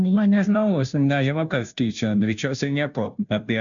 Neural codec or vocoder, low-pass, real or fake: codec, 16 kHz, 0.5 kbps, FunCodec, trained on Chinese and English, 25 frames a second; 7.2 kHz; fake